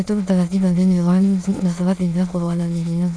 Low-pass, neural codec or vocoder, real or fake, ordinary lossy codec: none; autoencoder, 22.05 kHz, a latent of 192 numbers a frame, VITS, trained on many speakers; fake; none